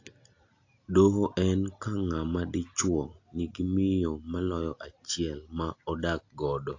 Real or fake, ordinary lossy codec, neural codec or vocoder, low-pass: real; AAC, 48 kbps; none; 7.2 kHz